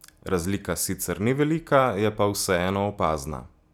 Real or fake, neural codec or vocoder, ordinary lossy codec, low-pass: real; none; none; none